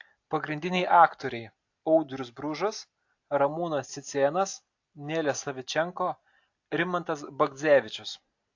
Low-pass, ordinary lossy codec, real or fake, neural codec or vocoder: 7.2 kHz; AAC, 48 kbps; real; none